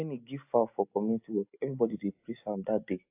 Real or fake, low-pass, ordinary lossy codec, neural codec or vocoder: real; 3.6 kHz; AAC, 32 kbps; none